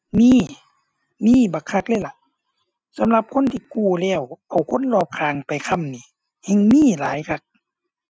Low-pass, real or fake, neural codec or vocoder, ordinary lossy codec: none; real; none; none